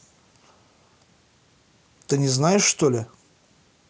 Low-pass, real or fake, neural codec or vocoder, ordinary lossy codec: none; real; none; none